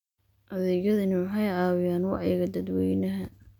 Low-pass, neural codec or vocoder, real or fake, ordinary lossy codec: 19.8 kHz; none; real; none